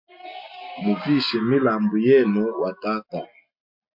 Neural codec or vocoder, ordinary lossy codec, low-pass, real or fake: none; MP3, 48 kbps; 5.4 kHz; real